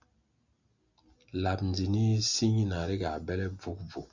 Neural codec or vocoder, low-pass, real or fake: none; 7.2 kHz; real